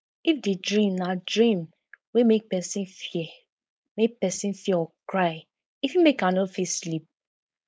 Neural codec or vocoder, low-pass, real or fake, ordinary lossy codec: codec, 16 kHz, 4.8 kbps, FACodec; none; fake; none